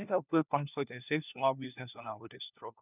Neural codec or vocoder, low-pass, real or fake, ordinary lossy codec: codec, 16 kHz, 1 kbps, FunCodec, trained on LibriTTS, 50 frames a second; 3.6 kHz; fake; none